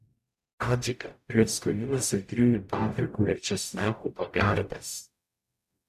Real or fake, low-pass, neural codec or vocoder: fake; 14.4 kHz; codec, 44.1 kHz, 0.9 kbps, DAC